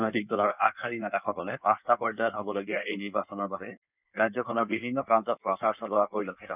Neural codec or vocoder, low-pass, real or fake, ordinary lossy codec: codec, 16 kHz in and 24 kHz out, 1.1 kbps, FireRedTTS-2 codec; 3.6 kHz; fake; none